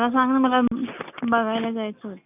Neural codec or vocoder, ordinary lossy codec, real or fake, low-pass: none; none; real; 3.6 kHz